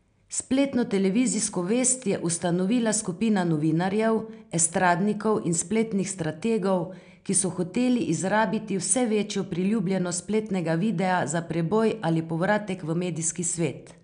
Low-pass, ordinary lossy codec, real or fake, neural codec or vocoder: 9.9 kHz; none; real; none